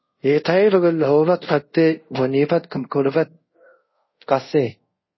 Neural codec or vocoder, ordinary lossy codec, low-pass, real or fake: codec, 24 kHz, 0.5 kbps, DualCodec; MP3, 24 kbps; 7.2 kHz; fake